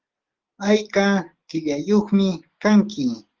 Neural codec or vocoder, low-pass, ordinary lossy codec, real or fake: none; 7.2 kHz; Opus, 16 kbps; real